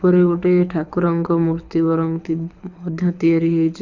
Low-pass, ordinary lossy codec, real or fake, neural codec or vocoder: 7.2 kHz; none; fake; codec, 24 kHz, 6 kbps, HILCodec